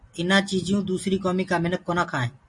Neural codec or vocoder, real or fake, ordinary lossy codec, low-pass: none; real; MP3, 48 kbps; 10.8 kHz